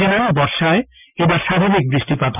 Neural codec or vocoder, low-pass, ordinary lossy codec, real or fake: none; 3.6 kHz; none; real